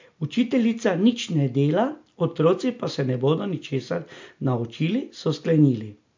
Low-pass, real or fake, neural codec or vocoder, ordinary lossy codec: 7.2 kHz; real; none; MP3, 48 kbps